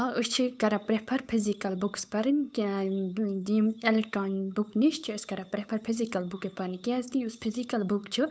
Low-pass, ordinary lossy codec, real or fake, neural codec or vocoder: none; none; fake; codec, 16 kHz, 8 kbps, FunCodec, trained on Chinese and English, 25 frames a second